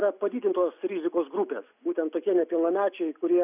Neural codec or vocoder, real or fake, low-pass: none; real; 3.6 kHz